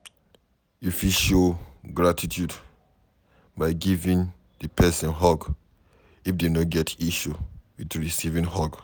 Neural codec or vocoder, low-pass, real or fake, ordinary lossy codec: none; none; real; none